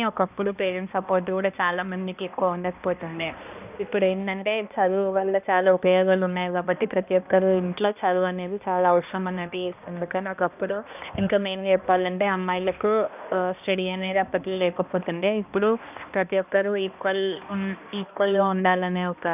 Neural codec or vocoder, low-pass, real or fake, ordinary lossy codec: codec, 16 kHz, 1 kbps, X-Codec, HuBERT features, trained on balanced general audio; 3.6 kHz; fake; none